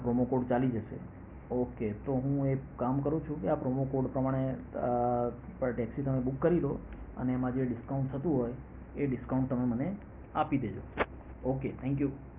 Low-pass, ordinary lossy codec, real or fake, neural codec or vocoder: 3.6 kHz; Opus, 64 kbps; real; none